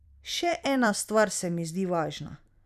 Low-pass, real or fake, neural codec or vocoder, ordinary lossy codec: 14.4 kHz; fake; vocoder, 44.1 kHz, 128 mel bands every 256 samples, BigVGAN v2; none